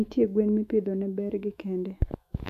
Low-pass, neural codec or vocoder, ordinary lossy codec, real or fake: 14.4 kHz; autoencoder, 48 kHz, 128 numbers a frame, DAC-VAE, trained on Japanese speech; none; fake